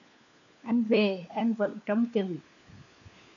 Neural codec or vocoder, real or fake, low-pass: codec, 16 kHz, 4 kbps, FunCodec, trained on LibriTTS, 50 frames a second; fake; 7.2 kHz